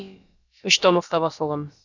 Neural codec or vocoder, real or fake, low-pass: codec, 16 kHz, about 1 kbps, DyCAST, with the encoder's durations; fake; 7.2 kHz